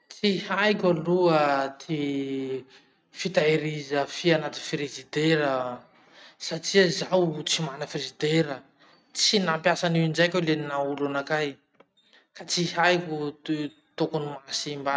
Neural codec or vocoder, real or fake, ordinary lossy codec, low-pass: none; real; none; none